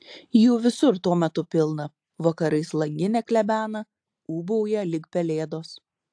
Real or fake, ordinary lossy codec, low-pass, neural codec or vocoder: real; AAC, 64 kbps; 9.9 kHz; none